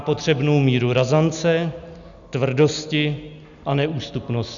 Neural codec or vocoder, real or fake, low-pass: none; real; 7.2 kHz